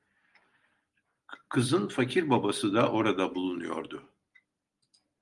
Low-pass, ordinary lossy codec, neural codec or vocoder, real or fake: 10.8 kHz; Opus, 32 kbps; none; real